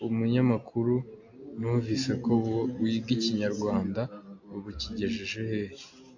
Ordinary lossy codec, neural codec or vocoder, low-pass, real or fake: AAC, 48 kbps; none; 7.2 kHz; real